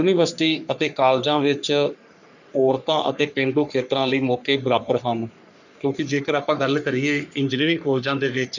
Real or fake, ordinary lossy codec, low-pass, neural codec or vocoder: fake; none; 7.2 kHz; codec, 44.1 kHz, 3.4 kbps, Pupu-Codec